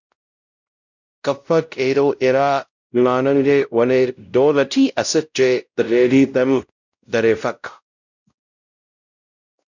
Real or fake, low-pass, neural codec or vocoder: fake; 7.2 kHz; codec, 16 kHz, 0.5 kbps, X-Codec, WavLM features, trained on Multilingual LibriSpeech